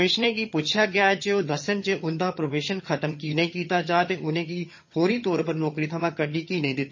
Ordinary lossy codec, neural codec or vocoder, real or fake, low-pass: MP3, 32 kbps; vocoder, 22.05 kHz, 80 mel bands, HiFi-GAN; fake; 7.2 kHz